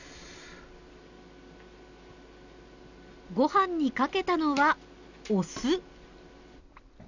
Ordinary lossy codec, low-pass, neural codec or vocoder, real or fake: none; 7.2 kHz; none; real